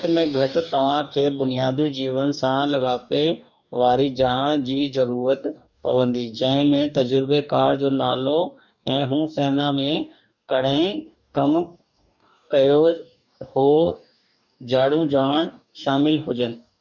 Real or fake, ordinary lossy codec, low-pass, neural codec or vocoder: fake; none; 7.2 kHz; codec, 44.1 kHz, 2.6 kbps, DAC